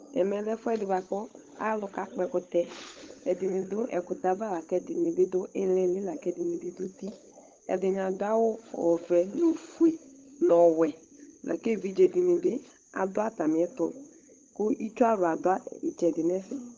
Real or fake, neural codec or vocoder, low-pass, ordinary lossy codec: fake; codec, 16 kHz, 16 kbps, FunCodec, trained on LibriTTS, 50 frames a second; 7.2 kHz; Opus, 24 kbps